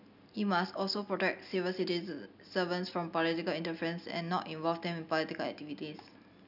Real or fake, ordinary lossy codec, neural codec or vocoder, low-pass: real; none; none; 5.4 kHz